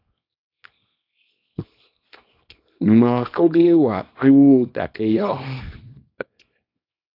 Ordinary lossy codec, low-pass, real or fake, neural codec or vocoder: AAC, 32 kbps; 5.4 kHz; fake; codec, 24 kHz, 0.9 kbps, WavTokenizer, small release